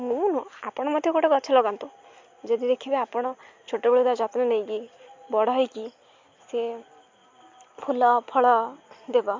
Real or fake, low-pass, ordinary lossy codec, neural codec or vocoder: real; 7.2 kHz; MP3, 48 kbps; none